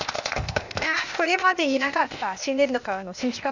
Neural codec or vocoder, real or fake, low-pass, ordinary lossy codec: codec, 16 kHz, 0.8 kbps, ZipCodec; fake; 7.2 kHz; none